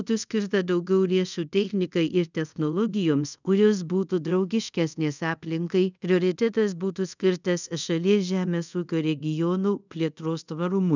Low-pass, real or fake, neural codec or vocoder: 7.2 kHz; fake; codec, 24 kHz, 0.5 kbps, DualCodec